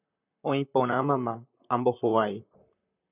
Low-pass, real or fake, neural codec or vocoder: 3.6 kHz; fake; vocoder, 44.1 kHz, 128 mel bands, Pupu-Vocoder